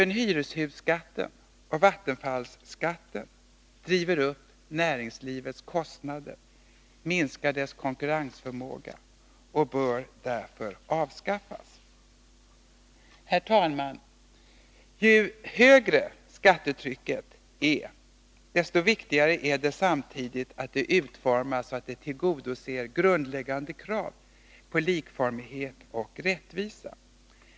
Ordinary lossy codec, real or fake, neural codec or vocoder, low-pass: none; real; none; none